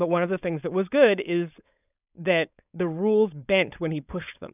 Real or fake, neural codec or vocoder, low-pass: fake; codec, 16 kHz, 4.8 kbps, FACodec; 3.6 kHz